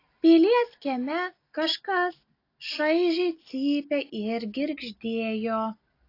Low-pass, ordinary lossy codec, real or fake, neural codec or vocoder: 5.4 kHz; AAC, 32 kbps; real; none